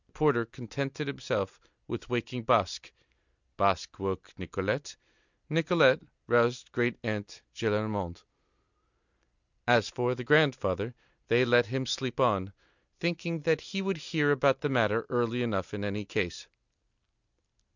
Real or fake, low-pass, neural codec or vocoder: real; 7.2 kHz; none